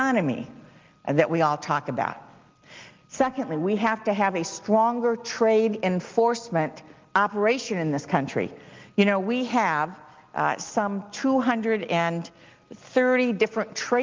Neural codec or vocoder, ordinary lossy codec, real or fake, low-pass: none; Opus, 32 kbps; real; 7.2 kHz